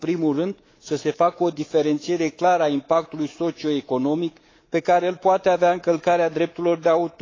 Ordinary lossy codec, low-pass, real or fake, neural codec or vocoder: AAC, 32 kbps; 7.2 kHz; fake; codec, 24 kHz, 3.1 kbps, DualCodec